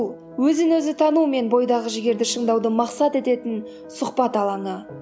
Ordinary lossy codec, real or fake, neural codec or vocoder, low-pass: none; real; none; none